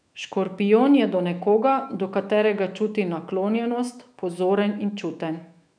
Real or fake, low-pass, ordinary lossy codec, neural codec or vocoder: fake; 9.9 kHz; none; autoencoder, 48 kHz, 128 numbers a frame, DAC-VAE, trained on Japanese speech